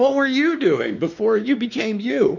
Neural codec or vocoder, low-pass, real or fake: codec, 16 kHz, 2 kbps, X-Codec, WavLM features, trained on Multilingual LibriSpeech; 7.2 kHz; fake